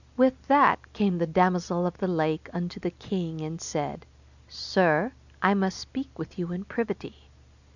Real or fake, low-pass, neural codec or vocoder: real; 7.2 kHz; none